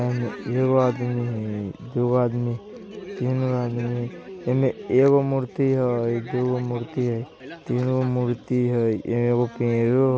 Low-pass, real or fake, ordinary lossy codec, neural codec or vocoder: none; real; none; none